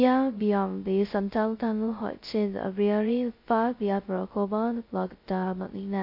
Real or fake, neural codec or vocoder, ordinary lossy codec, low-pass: fake; codec, 16 kHz, 0.2 kbps, FocalCodec; MP3, 32 kbps; 5.4 kHz